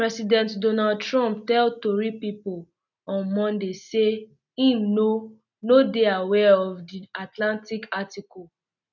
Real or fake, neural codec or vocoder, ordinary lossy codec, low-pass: real; none; none; 7.2 kHz